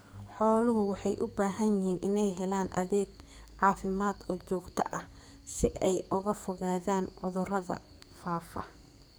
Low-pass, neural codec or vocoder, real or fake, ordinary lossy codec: none; codec, 44.1 kHz, 2.6 kbps, SNAC; fake; none